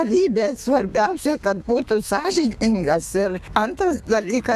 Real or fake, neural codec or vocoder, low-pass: fake; codec, 32 kHz, 1.9 kbps, SNAC; 14.4 kHz